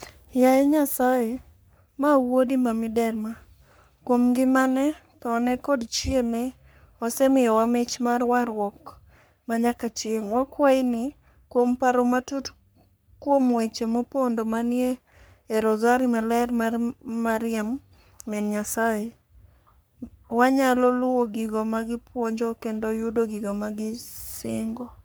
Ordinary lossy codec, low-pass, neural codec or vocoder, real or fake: none; none; codec, 44.1 kHz, 3.4 kbps, Pupu-Codec; fake